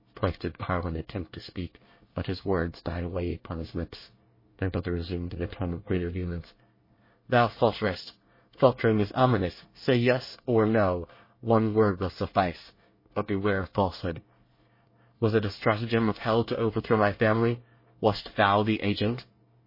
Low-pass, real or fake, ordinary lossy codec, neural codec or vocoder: 5.4 kHz; fake; MP3, 24 kbps; codec, 24 kHz, 1 kbps, SNAC